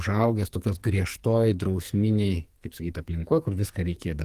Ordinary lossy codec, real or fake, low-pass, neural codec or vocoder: Opus, 24 kbps; fake; 14.4 kHz; codec, 44.1 kHz, 2.6 kbps, SNAC